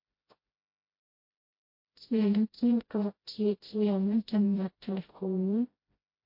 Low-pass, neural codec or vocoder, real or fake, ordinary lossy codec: 5.4 kHz; codec, 16 kHz, 0.5 kbps, FreqCodec, smaller model; fake; MP3, 32 kbps